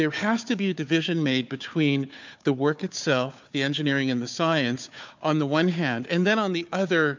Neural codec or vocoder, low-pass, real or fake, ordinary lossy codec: codec, 16 kHz, 4 kbps, FunCodec, trained on Chinese and English, 50 frames a second; 7.2 kHz; fake; MP3, 64 kbps